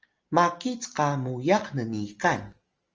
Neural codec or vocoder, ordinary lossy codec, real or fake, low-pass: none; Opus, 24 kbps; real; 7.2 kHz